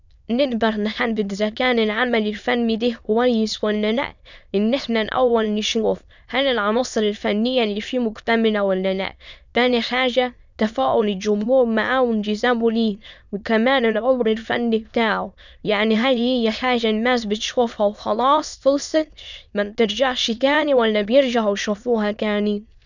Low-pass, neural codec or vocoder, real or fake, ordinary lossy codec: 7.2 kHz; autoencoder, 22.05 kHz, a latent of 192 numbers a frame, VITS, trained on many speakers; fake; none